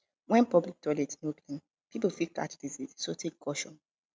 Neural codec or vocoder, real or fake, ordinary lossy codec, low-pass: none; real; none; none